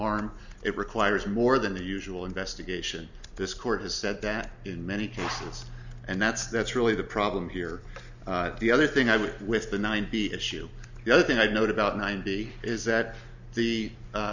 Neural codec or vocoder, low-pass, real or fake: none; 7.2 kHz; real